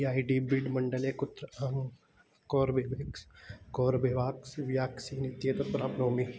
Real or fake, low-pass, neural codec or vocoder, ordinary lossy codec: real; none; none; none